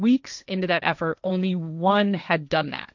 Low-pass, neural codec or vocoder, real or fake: 7.2 kHz; codec, 16 kHz, 1.1 kbps, Voila-Tokenizer; fake